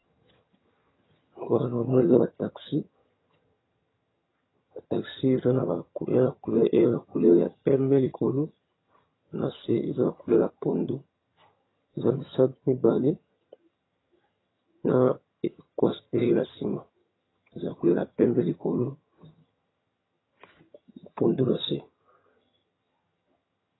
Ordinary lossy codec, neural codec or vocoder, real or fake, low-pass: AAC, 16 kbps; vocoder, 22.05 kHz, 80 mel bands, HiFi-GAN; fake; 7.2 kHz